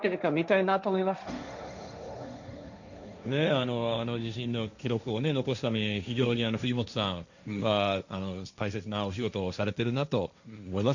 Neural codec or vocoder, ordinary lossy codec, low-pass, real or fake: codec, 16 kHz, 1.1 kbps, Voila-Tokenizer; none; 7.2 kHz; fake